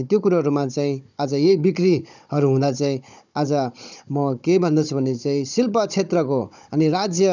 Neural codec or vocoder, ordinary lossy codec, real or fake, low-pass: codec, 16 kHz, 16 kbps, FunCodec, trained on Chinese and English, 50 frames a second; none; fake; 7.2 kHz